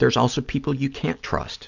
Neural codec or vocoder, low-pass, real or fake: none; 7.2 kHz; real